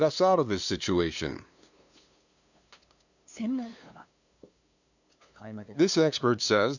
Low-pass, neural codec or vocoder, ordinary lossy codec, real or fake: 7.2 kHz; codec, 16 kHz, 2 kbps, FunCodec, trained on LibriTTS, 25 frames a second; none; fake